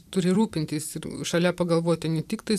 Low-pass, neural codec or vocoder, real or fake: 14.4 kHz; vocoder, 44.1 kHz, 128 mel bands every 256 samples, BigVGAN v2; fake